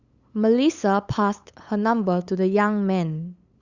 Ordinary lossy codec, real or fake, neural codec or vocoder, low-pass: Opus, 64 kbps; fake; codec, 16 kHz, 8 kbps, FunCodec, trained on LibriTTS, 25 frames a second; 7.2 kHz